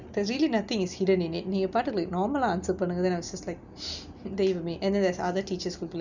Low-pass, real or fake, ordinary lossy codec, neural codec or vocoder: 7.2 kHz; real; none; none